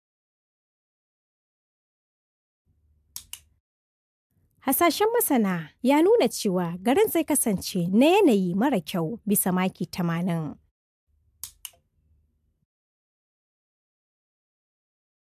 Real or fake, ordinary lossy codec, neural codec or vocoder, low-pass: real; AAC, 96 kbps; none; 14.4 kHz